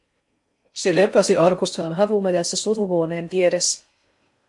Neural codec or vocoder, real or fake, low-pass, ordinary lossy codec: codec, 16 kHz in and 24 kHz out, 0.6 kbps, FocalCodec, streaming, 4096 codes; fake; 10.8 kHz; MP3, 64 kbps